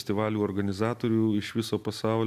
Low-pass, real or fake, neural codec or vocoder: 14.4 kHz; real; none